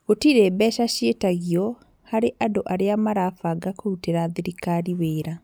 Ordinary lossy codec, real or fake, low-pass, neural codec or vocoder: none; real; none; none